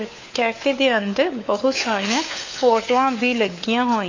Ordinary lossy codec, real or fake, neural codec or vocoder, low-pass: AAC, 48 kbps; fake; codec, 16 kHz, 4 kbps, FunCodec, trained on LibriTTS, 50 frames a second; 7.2 kHz